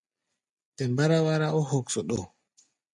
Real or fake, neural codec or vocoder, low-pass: real; none; 10.8 kHz